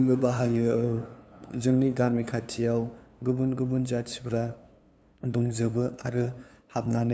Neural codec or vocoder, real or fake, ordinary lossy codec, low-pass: codec, 16 kHz, 2 kbps, FunCodec, trained on LibriTTS, 25 frames a second; fake; none; none